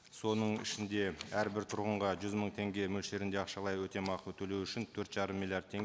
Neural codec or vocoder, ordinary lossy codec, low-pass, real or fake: none; none; none; real